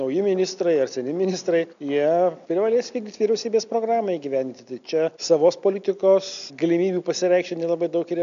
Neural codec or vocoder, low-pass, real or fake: none; 7.2 kHz; real